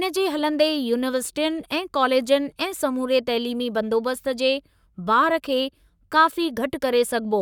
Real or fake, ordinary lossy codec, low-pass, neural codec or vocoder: fake; none; 19.8 kHz; codec, 44.1 kHz, 7.8 kbps, Pupu-Codec